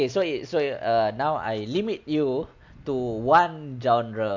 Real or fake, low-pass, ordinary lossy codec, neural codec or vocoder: real; 7.2 kHz; MP3, 64 kbps; none